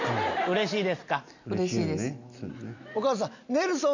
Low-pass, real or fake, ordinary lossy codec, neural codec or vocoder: 7.2 kHz; real; none; none